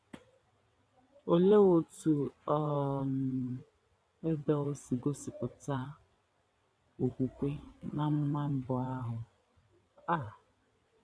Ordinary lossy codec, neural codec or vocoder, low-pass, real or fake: none; vocoder, 22.05 kHz, 80 mel bands, WaveNeXt; none; fake